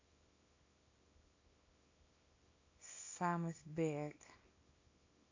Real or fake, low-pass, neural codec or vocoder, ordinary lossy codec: fake; 7.2 kHz; codec, 24 kHz, 0.9 kbps, WavTokenizer, small release; AAC, 48 kbps